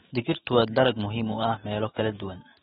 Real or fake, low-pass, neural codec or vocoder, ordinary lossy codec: fake; 19.8 kHz; autoencoder, 48 kHz, 128 numbers a frame, DAC-VAE, trained on Japanese speech; AAC, 16 kbps